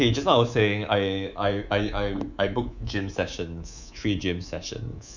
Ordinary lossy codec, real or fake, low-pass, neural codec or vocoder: none; fake; 7.2 kHz; codec, 24 kHz, 3.1 kbps, DualCodec